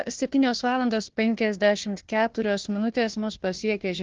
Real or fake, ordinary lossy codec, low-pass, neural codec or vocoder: fake; Opus, 16 kbps; 7.2 kHz; codec, 16 kHz, 1 kbps, FunCodec, trained on Chinese and English, 50 frames a second